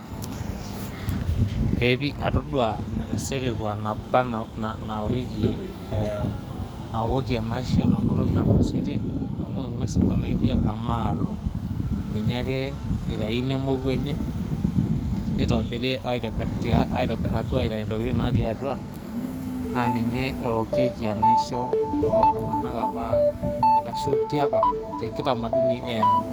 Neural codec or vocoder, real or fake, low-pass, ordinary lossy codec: codec, 44.1 kHz, 2.6 kbps, SNAC; fake; none; none